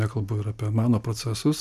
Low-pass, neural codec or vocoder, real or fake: 14.4 kHz; none; real